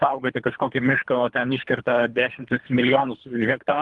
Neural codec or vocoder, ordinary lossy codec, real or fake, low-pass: codec, 24 kHz, 3 kbps, HILCodec; Opus, 32 kbps; fake; 10.8 kHz